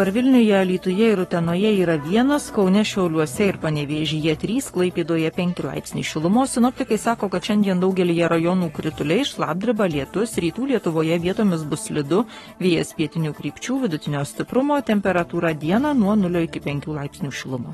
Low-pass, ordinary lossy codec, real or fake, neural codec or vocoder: 19.8 kHz; AAC, 32 kbps; fake; codec, 44.1 kHz, 7.8 kbps, Pupu-Codec